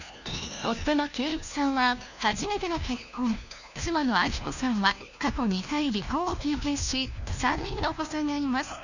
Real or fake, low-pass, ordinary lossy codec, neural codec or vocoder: fake; 7.2 kHz; none; codec, 16 kHz, 1 kbps, FunCodec, trained on LibriTTS, 50 frames a second